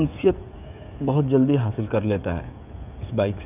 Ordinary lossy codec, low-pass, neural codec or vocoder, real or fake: none; 3.6 kHz; codec, 16 kHz, 16 kbps, FreqCodec, smaller model; fake